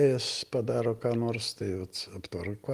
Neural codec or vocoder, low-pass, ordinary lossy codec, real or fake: none; 14.4 kHz; Opus, 24 kbps; real